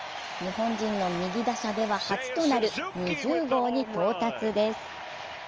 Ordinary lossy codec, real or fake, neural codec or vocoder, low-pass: Opus, 24 kbps; real; none; 7.2 kHz